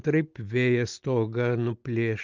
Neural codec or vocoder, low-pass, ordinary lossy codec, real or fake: none; 7.2 kHz; Opus, 24 kbps; real